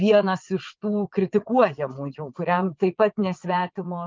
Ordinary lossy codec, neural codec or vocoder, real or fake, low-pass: Opus, 24 kbps; vocoder, 22.05 kHz, 80 mel bands, WaveNeXt; fake; 7.2 kHz